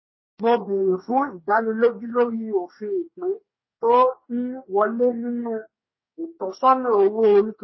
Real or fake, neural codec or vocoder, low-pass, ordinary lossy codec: fake; codec, 44.1 kHz, 2.6 kbps, DAC; 7.2 kHz; MP3, 24 kbps